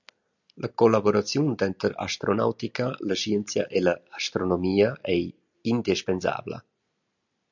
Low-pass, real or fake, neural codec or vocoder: 7.2 kHz; real; none